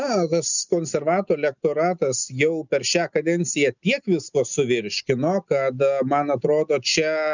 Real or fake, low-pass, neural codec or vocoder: real; 7.2 kHz; none